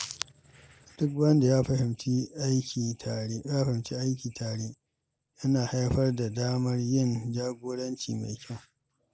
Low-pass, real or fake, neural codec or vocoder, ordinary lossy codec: none; real; none; none